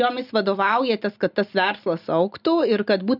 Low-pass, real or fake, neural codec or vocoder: 5.4 kHz; real; none